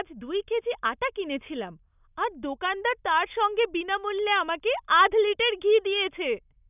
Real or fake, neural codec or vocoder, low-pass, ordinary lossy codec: real; none; 3.6 kHz; none